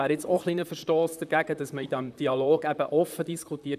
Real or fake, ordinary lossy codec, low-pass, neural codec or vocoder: fake; none; 14.4 kHz; vocoder, 44.1 kHz, 128 mel bands, Pupu-Vocoder